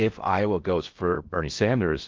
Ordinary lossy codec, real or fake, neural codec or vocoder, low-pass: Opus, 32 kbps; fake; codec, 16 kHz in and 24 kHz out, 0.6 kbps, FocalCodec, streaming, 4096 codes; 7.2 kHz